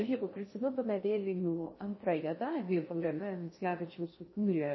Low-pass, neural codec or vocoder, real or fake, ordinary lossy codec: 7.2 kHz; codec, 16 kHz in and 24 kHz out, 0.6 kbps, FocalCodec, streaming, 4096 codes; fake; MP3, 24 kbps